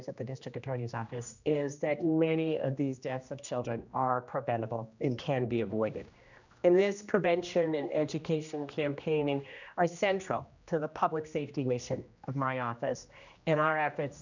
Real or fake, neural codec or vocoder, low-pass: fake; codec, 16 kHz, 1 kbps, X-Codec, HuBERT features, trained on general audio; 7.2 kHz